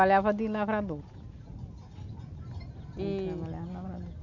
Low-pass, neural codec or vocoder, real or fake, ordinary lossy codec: 7.2 kHz; none; real; none